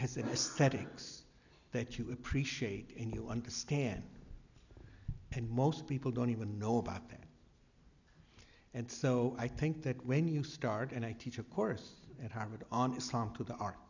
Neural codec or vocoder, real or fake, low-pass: none; real; 7.2 kHz